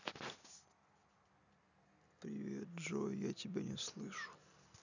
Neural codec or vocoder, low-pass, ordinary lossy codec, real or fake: none; 7.2 kHz; none; real